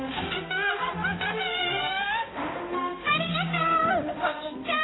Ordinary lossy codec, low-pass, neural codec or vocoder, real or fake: AAC, 16 kbps; 7.2 kHz; codec, 16 kHz, 0.5 kbps, X-Codec, HuBERT features, trained on balanced general audio; fake